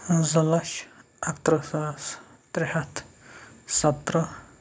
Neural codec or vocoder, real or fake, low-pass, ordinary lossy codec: none; real; none; none